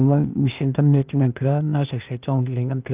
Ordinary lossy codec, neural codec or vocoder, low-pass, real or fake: Opus, 24 kbps; codec, 16 kHz, 0.8 kbps, ZipCodec; 3.6 kHz; fake